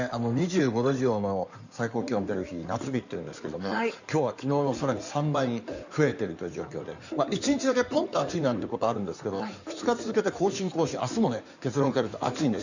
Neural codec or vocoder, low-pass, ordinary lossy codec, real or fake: codec, 16 kHz in and 24 kHz out, 2.2 kbps, FireRedTTS-2 codec; 7.2 kHz; none; fake